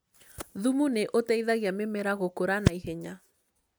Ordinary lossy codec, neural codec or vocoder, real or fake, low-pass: none; none; real; none